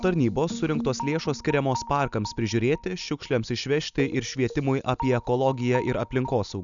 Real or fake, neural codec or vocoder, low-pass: real; none; 7.2 kHz